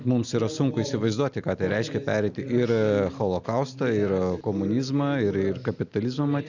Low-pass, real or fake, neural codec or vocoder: 7.2 kHz; real; none